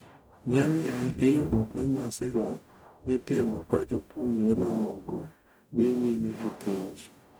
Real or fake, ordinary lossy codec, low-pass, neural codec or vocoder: fake; none; none; codec, 44.1 kHz, 0.9 kbps, DAC